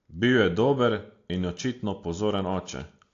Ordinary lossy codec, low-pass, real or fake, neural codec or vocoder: AAC, 48 kbps; 7.2 kHz; real; none